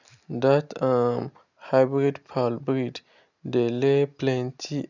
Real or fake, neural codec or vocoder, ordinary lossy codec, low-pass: real; none; none; 7.2 kHz